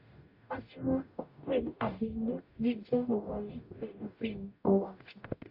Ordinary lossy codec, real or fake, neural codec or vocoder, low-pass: AAC, 32 kbps; fake; codec, 44.1 kHz, 0.9 kbps, DAC; 5.4 kHz